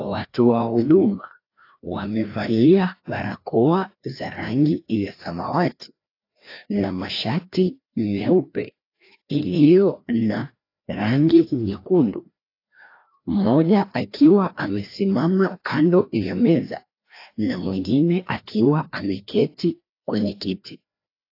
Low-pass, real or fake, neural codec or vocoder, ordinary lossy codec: 5.4 kHz; fake; codec, 16 kHz, 1 kbps, FreqCodec, larger model; AAC, 32 kbps